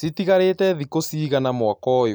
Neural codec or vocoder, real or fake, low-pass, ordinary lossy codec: none; real; none; none